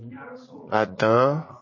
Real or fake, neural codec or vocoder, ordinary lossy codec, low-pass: fake; vocoder, 44.1 kHz, 80 mel bands, Vocos; MP3, 32 kbps; 7.2 kHz